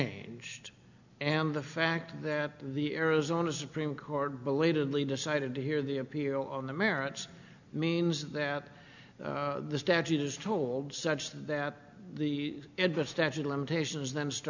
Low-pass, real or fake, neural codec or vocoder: 7.2 kHz; real; none